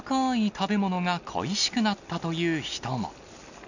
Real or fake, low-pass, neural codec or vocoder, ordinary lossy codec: real; 7.2 kHz; none; none